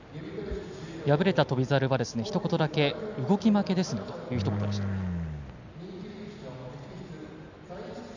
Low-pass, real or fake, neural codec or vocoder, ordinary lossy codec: 7.2 kHz; real; none; none